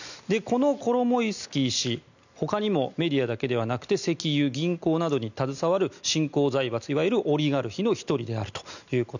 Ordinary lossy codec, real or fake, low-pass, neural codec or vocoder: none; real; 7.2 kHz; none